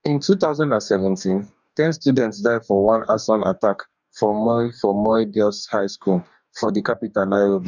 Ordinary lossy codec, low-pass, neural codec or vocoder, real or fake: none; 7.2 kHz; codec, 44.1 kHz, 2.6 kbps, DAC; fake